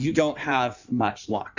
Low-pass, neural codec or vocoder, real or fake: 7.2 kHz; codec, 16 kHz in and 24 kHz out, 1.1 kbps, FireRedTTS-2 codec; fake